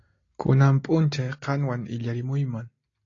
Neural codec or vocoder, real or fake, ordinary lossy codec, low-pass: none; real; AAC, 32 kbps; 7.2 kHz